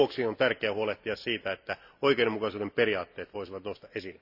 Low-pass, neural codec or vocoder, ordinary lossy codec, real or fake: 5.4 kHz; none; none; real